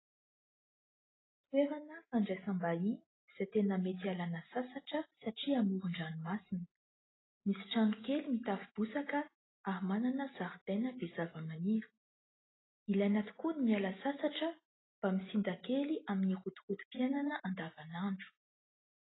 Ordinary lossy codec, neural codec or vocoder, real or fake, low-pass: AAC, 16 kbps; none; real; 7.2 kHz